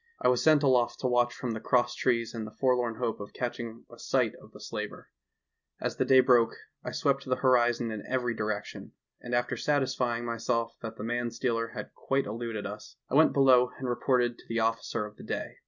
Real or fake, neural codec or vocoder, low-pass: real; none; 7.2 kHz